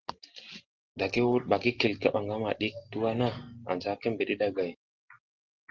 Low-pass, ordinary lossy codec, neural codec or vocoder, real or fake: 7.2 kHz; Opus, 16 kbps; none; real